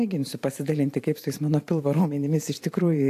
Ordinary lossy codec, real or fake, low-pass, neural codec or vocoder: AAC, 64 kbps; fake; 14.4 kHz; vocoder, 44.1 kHz, 128 mel bands every 512 samples, BigVGAN v2